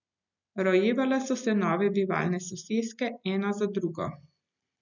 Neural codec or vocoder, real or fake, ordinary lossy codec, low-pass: none; real; none; 7.2 kHz